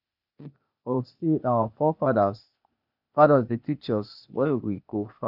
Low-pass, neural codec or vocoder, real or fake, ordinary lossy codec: 5.4 kHz; codec, 16 kHz, 0.8 kbps, ZipCodec; fake; none